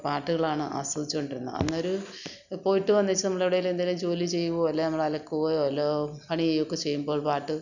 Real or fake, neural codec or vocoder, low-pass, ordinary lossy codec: real; none; 7.2 kHz; none